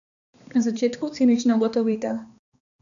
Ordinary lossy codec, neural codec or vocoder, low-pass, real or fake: none; codec, 16 kHz, 2 kbps, X-Codec, HuBERT features, trained on balanced general audio; 7.2 kHz; fake